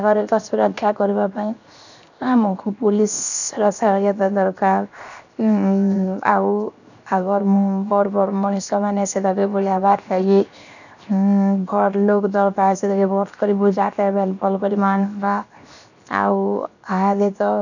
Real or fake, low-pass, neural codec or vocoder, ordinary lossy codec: fake; 7.2 kHz; codec, 16 kHz, 0.7 kbps, FocalCodec; none